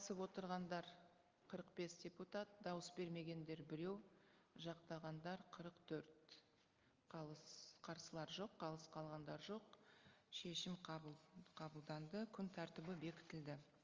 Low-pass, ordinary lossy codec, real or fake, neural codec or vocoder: 7.2 kHz; Opus, 32 kbps; real; none